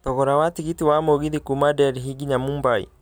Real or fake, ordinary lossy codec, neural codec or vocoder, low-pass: real; none; none; none